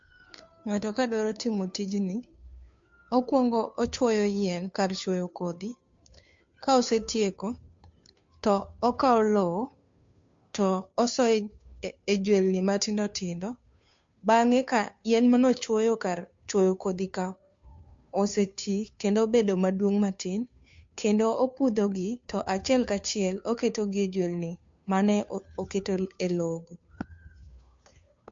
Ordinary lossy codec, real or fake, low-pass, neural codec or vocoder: MP3, 48 kbps; fake; 7.2 kHz; codec, 16 kHz, 2 kbps, FunCodec, trained on Chinese and English, 25 frames a second